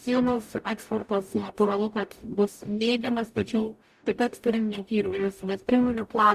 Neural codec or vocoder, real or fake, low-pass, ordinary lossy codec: codec, 44.1 kHz, 0.9 kbps, DAC; fake; 14.4 kHz; Opus, 64 kbps